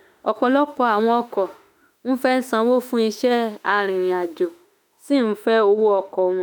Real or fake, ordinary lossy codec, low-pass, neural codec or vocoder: fake; none; none; autoencoder, 48 kHz, 32 numbers a frame, DAC-VAE, trained on Japanese speech